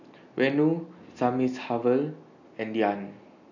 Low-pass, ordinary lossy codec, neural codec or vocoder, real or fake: 7.2 kHz; none; none; real